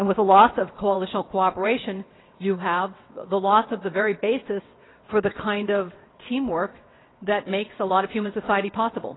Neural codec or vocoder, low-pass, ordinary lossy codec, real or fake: vocoder, 22.05 kHz, 80 mel bands, WaveNeXt; 7.2 kHz; AAC, 16 kbps; fake